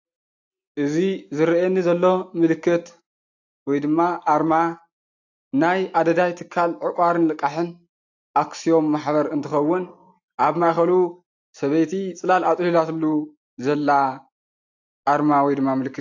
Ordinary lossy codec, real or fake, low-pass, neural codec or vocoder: AAC, 48 kbps; real; 7.2 kHz; none